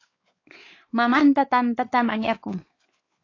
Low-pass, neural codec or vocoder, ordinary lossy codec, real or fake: 7.2 kHz; codec, 16 kHz, 4 kbps, X-Codec, WavLM features, trained on Multilingual LibriSpeech; AAC, 32 kbps; fake